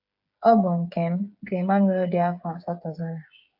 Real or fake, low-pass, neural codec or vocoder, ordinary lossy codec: fake; 5.4 kHz; codec, 16 kHz, 8 kbps, FreqCodec, smaller model; AAC, 48 kbps